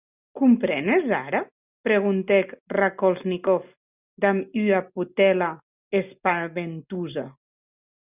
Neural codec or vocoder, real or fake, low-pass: none; real; 3.6 kHz